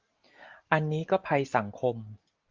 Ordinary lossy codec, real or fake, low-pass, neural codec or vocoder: Opus, 24 kbps; real; 7.2 kHz; none